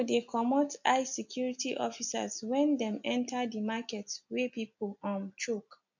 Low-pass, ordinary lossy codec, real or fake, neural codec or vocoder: 7.2 kHz; MP3, 64 kbps; real; none